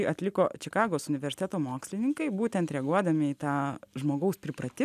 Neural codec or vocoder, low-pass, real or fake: none; 14.4 kHz; real